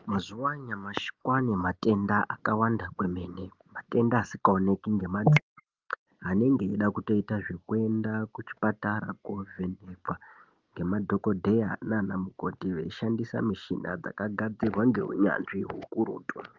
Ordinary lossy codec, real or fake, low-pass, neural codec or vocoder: Opus, 32 kbps; real; 7.2 kHz; none